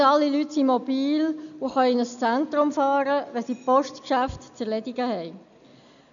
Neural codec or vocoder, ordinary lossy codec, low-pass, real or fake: none; none; 7.2 kHz; real